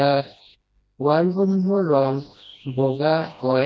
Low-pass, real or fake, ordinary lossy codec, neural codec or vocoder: none; fake; none; codec, 16 kHz, 1 kbps, FreqCodec, smaller model